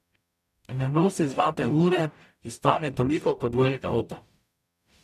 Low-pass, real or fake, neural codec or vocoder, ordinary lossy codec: 14.4 kHz; fake; codec, 44.1 kHz, 0.9 kbps, DAC; none